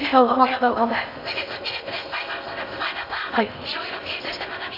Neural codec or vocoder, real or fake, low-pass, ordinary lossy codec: codec, 16 kHz in and 24 kHz out, 0.6 kbps, FocalCodec, streaming, 4096 codes; fake; 5.4 kHz; none